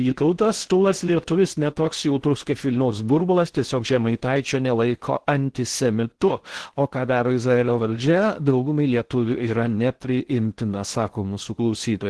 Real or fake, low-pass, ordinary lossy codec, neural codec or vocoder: fake; 10.8 kHz; Opus, 16 kbps; codec, 16 kHz in and 24 kHz out, 0.6 kbps, FocalCodec, streaming, 2048 codes